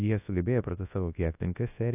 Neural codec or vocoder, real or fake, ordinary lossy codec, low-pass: codec, 24 kHz, 0.9 kbps, WavTokenizer, large speech release; fake; AAC, 24 kbps; 3.6 kHz